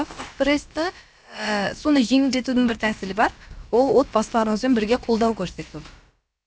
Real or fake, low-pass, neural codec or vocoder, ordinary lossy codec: fake; none; codec, 16 kHz, about 1 kbps, DyCAST, with the encoder's durations; none